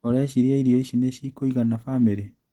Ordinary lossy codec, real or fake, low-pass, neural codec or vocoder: Opus, 16 kbps; real; 19.8 kHz; none